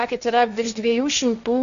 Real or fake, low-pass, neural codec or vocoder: fake; 7.2 kHz; codec, 16 kHz, 1.1 kbps, Voila-Tokenizer